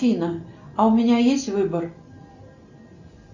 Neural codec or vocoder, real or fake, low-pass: none; real; 7.2 kHz